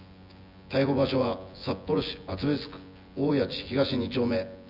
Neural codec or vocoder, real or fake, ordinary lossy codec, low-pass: vocoder, 24 kHz, 100 mel bands, Vocos; fake; none; 5.4 kHz